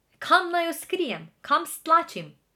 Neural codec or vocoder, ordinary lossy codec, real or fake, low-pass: none; none; real; 19.8 kHz